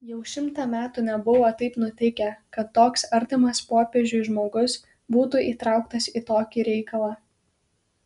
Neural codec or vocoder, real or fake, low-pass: none; real; 10.8 kHz